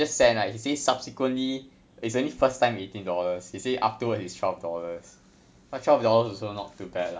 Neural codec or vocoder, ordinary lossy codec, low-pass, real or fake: none; none; none; real